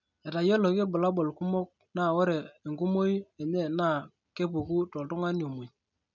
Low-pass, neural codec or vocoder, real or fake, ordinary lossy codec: 7.2 kHz; none; real; none